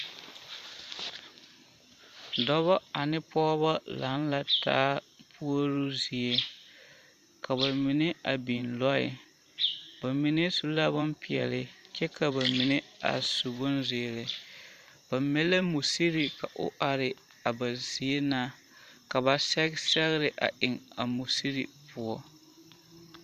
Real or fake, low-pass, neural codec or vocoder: fake; 14.4 kHz; vocoder, 44.1 kHz, 128 mel bands every 512 samples, BigVGAN v2